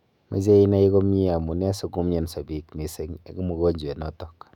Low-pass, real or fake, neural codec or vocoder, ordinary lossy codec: 19.8 kHz; fake; autoencoder, 48 kHz, 128 numbers a frame, DAC-VAE, trained on Japanese speech; none